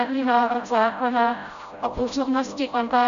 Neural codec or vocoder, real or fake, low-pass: codec, 16 kHz, 0.5 kbps, FreqCodec, smaller model; fake; 7.2 kHz